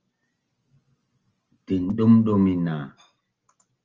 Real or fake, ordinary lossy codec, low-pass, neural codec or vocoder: real; Opus, 24 kbps; 7.2 kHz; none